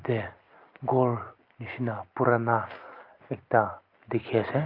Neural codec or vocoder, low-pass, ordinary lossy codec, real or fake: none; 5.4 kHz; Opus, 32 kbps; real